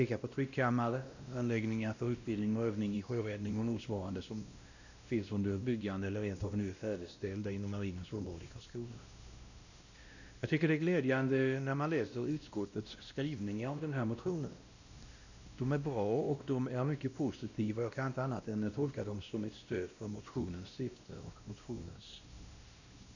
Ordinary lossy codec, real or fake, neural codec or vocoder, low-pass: none; fake; codec, 16 kHz, 1 kbps, X-Codec, WavLM features, trained on Multilingual LibriSpeech; 7.2 kHz